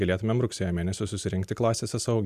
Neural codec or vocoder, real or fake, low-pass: none; real; 14.4 kHz